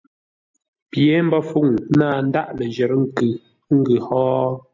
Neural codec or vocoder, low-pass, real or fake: none; 7.2 kHz; real